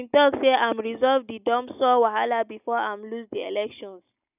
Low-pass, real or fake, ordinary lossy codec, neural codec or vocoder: 3.6 kHz; real; none; none